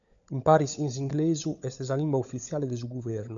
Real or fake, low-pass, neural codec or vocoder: fake; 7.2 kHz; codec, 16 kHz, 16 kbps, FunCodec, trained on LibriTTS, 50 frames a second